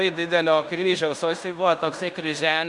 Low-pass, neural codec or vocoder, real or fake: 10.8 kHz; codec, 16 kHz in and 24 kHz out, 0.9 kbps, LongCat-Audio-Codec, fine tuned four codebook decoder; fake